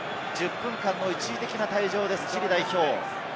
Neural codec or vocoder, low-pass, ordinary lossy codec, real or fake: none; none; none; real